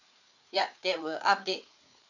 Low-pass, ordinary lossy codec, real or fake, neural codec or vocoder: 7.2 kHz; none; fake; codec, 16 kHz, 4 kbps, FreqCodec, larger model